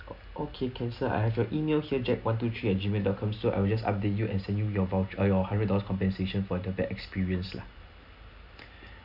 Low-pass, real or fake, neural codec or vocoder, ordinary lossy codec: 5.4 kHz; real; none; none